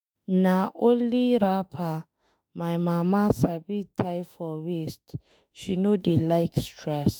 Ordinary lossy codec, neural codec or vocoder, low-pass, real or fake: none; autoencoder, 48 kHz, 32 numbers a frame, DAC-VAE, trained on Japanese speech; none; fake